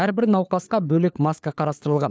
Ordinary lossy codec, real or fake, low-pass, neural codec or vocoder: none; fake; none; codec, 16 kHz, 4 kbps, FunCodec, trained on LibriTTS, 50 frames a second